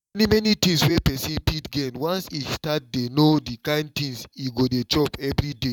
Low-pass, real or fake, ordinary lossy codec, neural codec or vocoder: 19.8 kHz; fake; none; vocoder, 44.1 kHz, 128 mel bands every 512 samples, BigVGAN v2